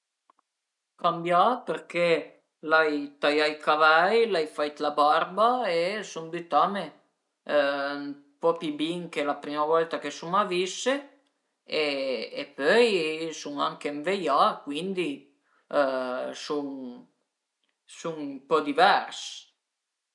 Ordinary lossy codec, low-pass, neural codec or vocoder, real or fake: none; 10.8 kHz; none; real